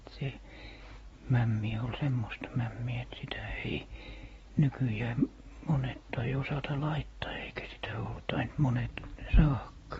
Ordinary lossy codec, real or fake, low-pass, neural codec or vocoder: AAC, 24 kbps; real; 19.8 kHz; none